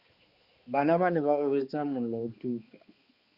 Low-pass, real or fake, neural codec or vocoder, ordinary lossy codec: 5.4 kHz; fake; codec, 16 kHz, 2 kbps, X-Codec, HuBERT features, trained on balanced general audio; Opus, 64 kbps